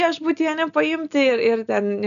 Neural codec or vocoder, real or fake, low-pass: none; real; 7.2 kHz